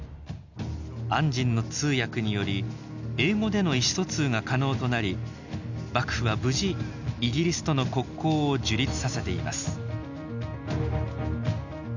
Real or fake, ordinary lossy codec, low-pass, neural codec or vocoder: real; none; 7.2 kHz; none